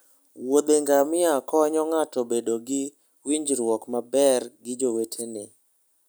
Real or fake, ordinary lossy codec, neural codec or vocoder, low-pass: real; none; none; none